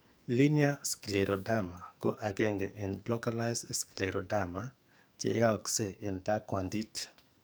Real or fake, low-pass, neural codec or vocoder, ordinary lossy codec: fake; none; codec, 44.1 kHz, 2.6 kbps, SNAC; none